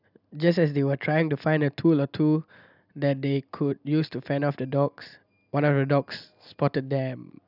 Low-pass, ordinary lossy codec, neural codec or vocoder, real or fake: 5.4 kHz; none; none; real